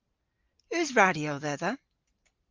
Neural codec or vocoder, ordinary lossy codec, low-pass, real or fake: none; Opus, 32 kbps; 7.2 kHz; real